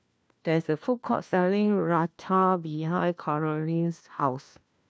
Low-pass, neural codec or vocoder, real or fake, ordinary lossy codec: none; codec, 16 kHz, 1 kbps, FunCodec, trained on LibriTTS, 50 frames a second; fake; none